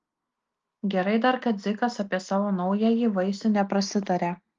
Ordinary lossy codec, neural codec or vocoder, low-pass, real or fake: Opus, 32 kbps; none; 7.2 kHz; real